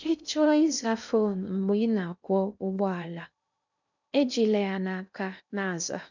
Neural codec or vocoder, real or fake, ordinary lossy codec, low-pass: codec, 16 kHz in and 24 kHz out, 0.6 kbps, FocalCodec, streaming, 2048 codes; fake; none; 7.2 kHz